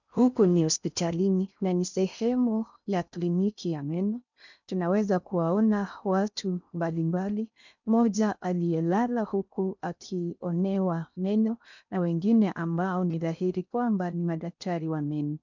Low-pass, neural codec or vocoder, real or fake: 7.2 kHz; codec, 16 kHz in and 24 kHz out, 0.6 kbps, FocalCodec, streaming, 4096 codes; fake